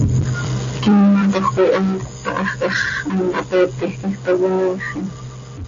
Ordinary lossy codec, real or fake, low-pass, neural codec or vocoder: AAC, 32 kbps; real; 7.2 kHz; none